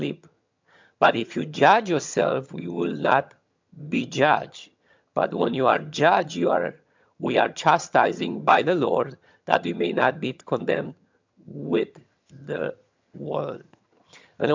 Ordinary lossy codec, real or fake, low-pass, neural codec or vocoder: MP3, 64 kbps; fake; 7.2 kHz; vocoder, 22.05 kHz, 80 mel bands, HiFi-GAN